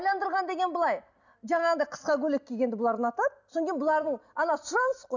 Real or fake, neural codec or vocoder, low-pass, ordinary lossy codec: real; none; 7.2 kHz; none